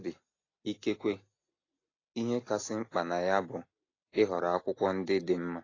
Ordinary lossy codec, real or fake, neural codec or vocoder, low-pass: AAC, 32 kbps; real; none; 7.2 kHz